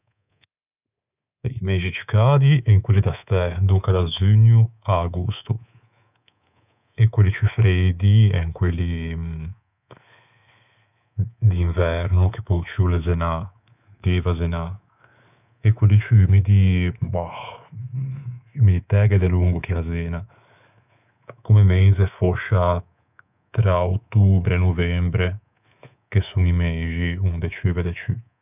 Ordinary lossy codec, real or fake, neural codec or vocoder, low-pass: none; fake; codec, 24 kHz, 3.1 kbps, DualCodec; 3.6 kHz